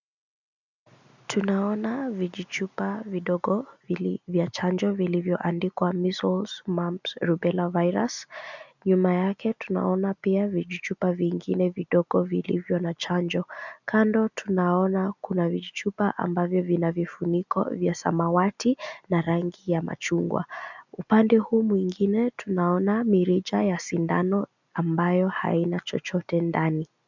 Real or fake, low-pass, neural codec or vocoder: real; 7.2 kHz; none